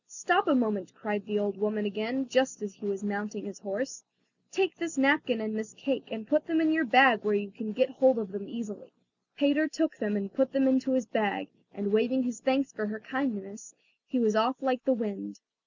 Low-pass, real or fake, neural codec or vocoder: 7.2 kHz; real; none